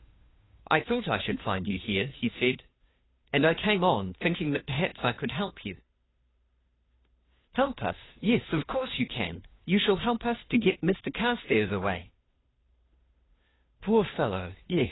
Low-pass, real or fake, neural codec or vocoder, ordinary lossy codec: 7.2 kHz; fake; codec, 16 kHz, 2 kbps, FunCodec, trained on Chinese and English, 25 frames a second; AAC, 16 kbps